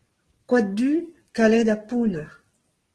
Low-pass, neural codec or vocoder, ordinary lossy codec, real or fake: 10.8 kHz; codec, 44.1 kHz, 7.8 kbps, DAC; Opus, 16 kbps; fake